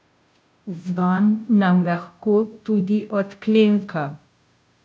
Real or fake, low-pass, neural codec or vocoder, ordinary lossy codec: fake; none; codec, 16 kHz, 0.5 kbps, FunCodec, trained on Chinese and English, 25 frames a second; none